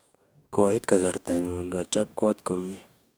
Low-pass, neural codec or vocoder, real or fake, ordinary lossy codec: none; codec, 44.1 kHz, 2.6 kbps, DAC; fake; none